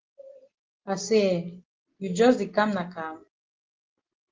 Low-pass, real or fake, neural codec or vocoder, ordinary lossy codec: 7.2 kHz; real; none; Opus, 16 kbps